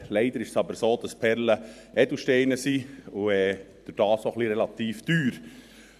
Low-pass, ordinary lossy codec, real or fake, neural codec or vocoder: 14.4 kHz; none; fake; vocoder, 44.1 kHz, 128 mel bands every 512 samples, BigVGAN v2